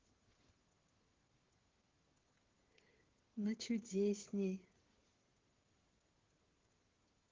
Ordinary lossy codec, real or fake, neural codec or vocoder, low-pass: Opus, 16 kbps; fake; codec, 16 kHz, 16 kbps, FreqCodec, smaller model; 7.2 kHz